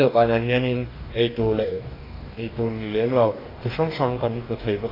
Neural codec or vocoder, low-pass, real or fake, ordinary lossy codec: codec, 44.1 kHz, 2.6 kbps, DAC; 5.4 kHz; fake; AAC, 24 kbps